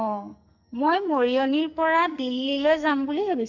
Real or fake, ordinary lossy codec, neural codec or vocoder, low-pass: fake; none; codec, 44.1 kHz, 2.6 kbps, SNAC; 7.2 kHz